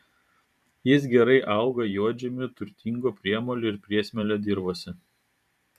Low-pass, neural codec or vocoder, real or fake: 14.4 kHz; vocoder, 44.1 kHz, 128 mel bands every 512 samples, BigVGAN v2; fake